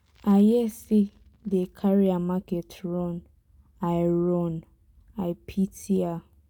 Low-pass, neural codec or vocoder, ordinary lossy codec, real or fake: 19.8 kHz; none; none; real